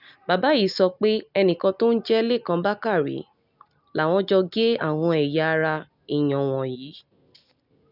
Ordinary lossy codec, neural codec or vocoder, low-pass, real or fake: none; none; 5.4 kHz; real